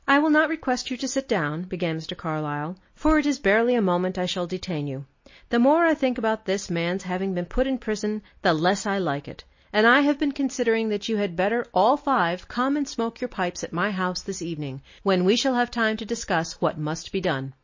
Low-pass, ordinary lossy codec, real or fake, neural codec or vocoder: 7.2 kHz; MP3, 32 kbps; real; none